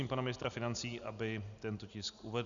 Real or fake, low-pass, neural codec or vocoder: real; 7.2 kHz; none